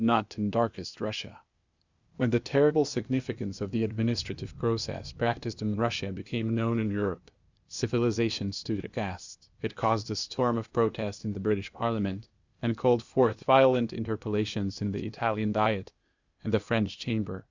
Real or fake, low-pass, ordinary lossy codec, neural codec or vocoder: fake; 7.2 kHz; Opus, 64 kbps; codec, 16 kHz, 0.8 kbps, ZipCodec